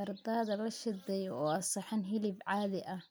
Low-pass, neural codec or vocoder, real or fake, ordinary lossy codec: none; none; real; none